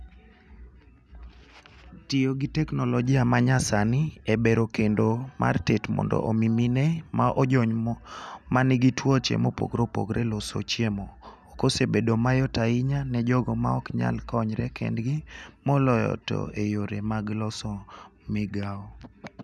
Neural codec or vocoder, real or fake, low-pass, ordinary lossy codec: none; real; none; none